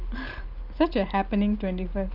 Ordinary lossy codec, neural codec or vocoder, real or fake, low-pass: Opus, 24 kbps; none; real; 5.4 kHz